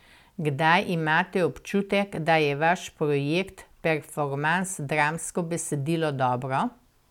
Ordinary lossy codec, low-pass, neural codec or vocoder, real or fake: none; 19.8 kHz; none; real